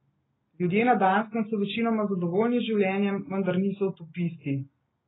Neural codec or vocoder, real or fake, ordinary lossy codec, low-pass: none; real; AAC, 16 kbps; 7.2 kHz